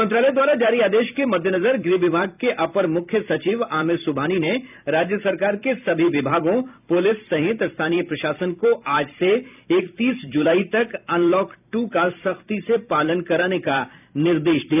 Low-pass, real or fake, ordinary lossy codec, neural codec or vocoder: 3.6 kHz; fake; none; vocoder, 44.1 kHz, 128 mel bands every 256 samples, BigVGAN v2